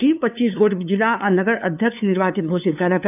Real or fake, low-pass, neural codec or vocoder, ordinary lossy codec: fake; 3.6 kHz; codec, 16 kHz, 2 kbps, FunCodec, trained on LibriTTS, 25 frames a second; none